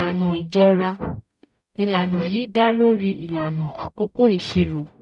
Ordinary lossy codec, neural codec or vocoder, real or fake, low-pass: none; codec, 44.1 kHz, 0.9 kbps, DAC; fake; 10.8 kHz